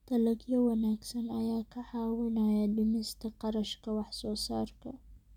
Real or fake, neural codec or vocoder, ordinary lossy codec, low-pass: real; none; none; 19.8 kHz